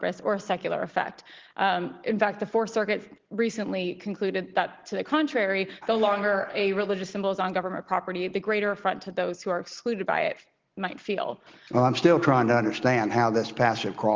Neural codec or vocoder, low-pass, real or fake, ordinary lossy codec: none; 7.2 kHz; real; Opus, 16 kbps